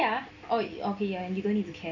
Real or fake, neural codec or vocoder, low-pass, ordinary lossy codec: real; none; 7.2 kHz; none